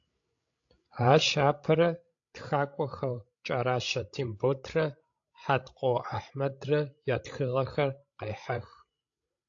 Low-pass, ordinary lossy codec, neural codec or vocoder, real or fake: 7.2 kHz; AAC, 48 kbps; codec, 16 kHz, 16 kbps, FreqCodec, larger model; fake